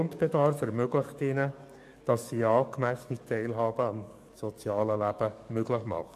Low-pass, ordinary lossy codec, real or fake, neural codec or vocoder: 14.4 kHz; MP3, 64 kbps; fake; codec, 44.1 kHz, 7.8 kbps, DAC